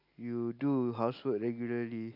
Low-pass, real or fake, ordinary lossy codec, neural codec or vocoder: 5.4 kHz; real; AAC, 48 kbps; none